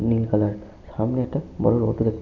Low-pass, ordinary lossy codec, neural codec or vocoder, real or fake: 7.2 kHz; none; none; real